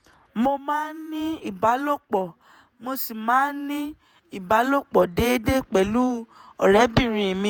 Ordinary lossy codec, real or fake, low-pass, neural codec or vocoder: none; fake; none; vocoder, 48 kHz, 128 mel bands, Vocos